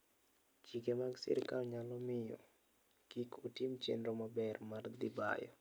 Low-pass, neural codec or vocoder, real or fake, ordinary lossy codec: none; none; real; none